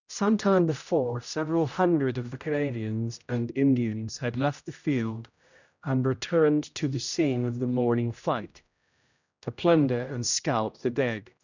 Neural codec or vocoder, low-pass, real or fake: codec, 16 kHz, 0.5 kbps, X-Codec, HuBERT features, trained on general audio; 7.2 kHz; fake